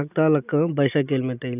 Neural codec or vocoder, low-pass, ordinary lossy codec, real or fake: none; 3.6 kHz; none; real